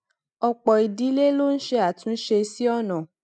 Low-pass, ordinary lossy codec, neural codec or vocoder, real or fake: none; none; none; real